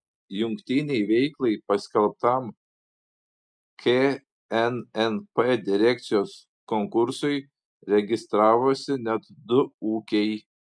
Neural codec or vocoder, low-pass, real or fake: none; 9.9 kHz; real